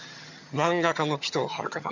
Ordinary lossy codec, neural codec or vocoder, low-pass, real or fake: none; vocoder, 22.05 kHz, 80 mel bands, HiFi-GAN; 7.2 kHz; fake